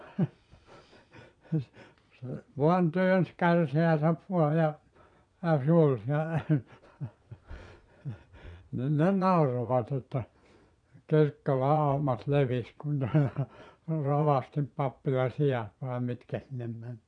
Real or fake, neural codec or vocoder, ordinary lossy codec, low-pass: fake; vocoder, 22.05 kHz, 80 mel bands, Vocos; none; 9.9 kHz